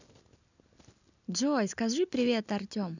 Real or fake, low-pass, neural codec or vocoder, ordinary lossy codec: real; 7.2 kHz; none; none